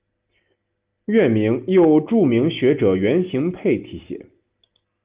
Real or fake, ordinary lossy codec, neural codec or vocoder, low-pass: real; Opus, 32 kbps; none; 3.6 kHz